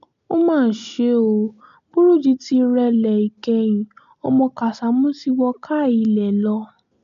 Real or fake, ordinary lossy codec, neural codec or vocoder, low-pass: real; MP3, 64 kbps; none; 7.2 kHz